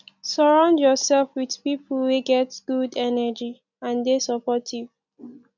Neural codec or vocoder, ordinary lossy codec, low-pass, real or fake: none; none; 7.2 kHz; real